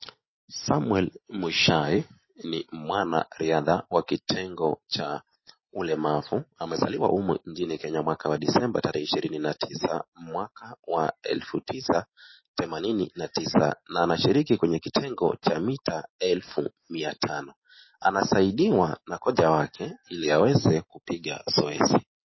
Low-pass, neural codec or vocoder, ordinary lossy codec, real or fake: 7.2 kHz; none; MP3, 24 kbps; real